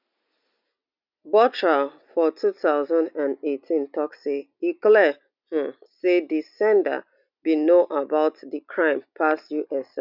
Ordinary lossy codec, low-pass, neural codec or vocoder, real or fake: none; 5.4 kHz; none; real